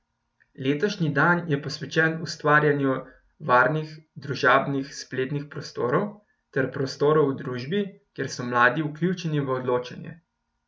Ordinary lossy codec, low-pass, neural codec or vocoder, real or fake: none; none; none; real